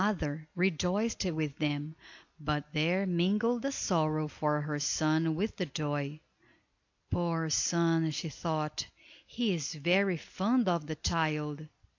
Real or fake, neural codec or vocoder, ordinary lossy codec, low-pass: real; none; AAC, 48 kbps; 7.2 kHz